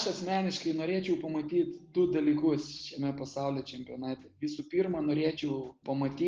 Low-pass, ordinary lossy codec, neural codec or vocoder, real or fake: 7.2 kHz; Opus, 16 kbps; none; real